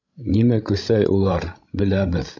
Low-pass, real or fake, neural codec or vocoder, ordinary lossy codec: 7.2 kHz; fake; codec, 16 kHz, 16 kbps, FreqCodec, larger model; AAC, 48 kbps